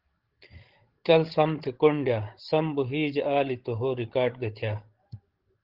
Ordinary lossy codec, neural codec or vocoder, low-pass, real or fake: Opus, 16 kbps; codec, 16 kHz, 16 kbps, FreqCodec, larger model; 5.4 kHz; fake